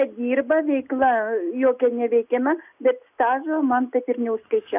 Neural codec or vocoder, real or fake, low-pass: none; real; 3.6 kHz